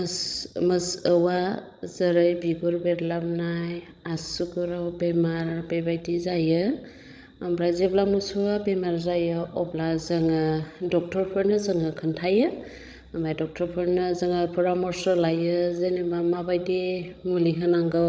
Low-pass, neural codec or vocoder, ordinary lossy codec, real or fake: none; codec, 16 kHz, 16 kbps, FreqCodec, larger model; none; fake